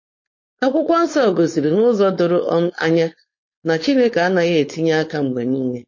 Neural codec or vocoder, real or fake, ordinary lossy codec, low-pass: codec, 16 kHz, 4.8 kbps, FACodec; fake; MP3, 32 kbps; 7.2 kHz